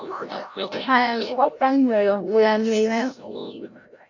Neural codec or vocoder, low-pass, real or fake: codec, 16 kHz, 0.5 kbps, FreqCodec, larger model; 7.2 kHz; fake